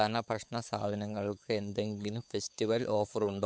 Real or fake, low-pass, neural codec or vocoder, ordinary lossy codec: fake; none; codec, 16 kHz, 4 kbps, X-Codec, WavLM features, trained on Multilingual LibriSpeech; none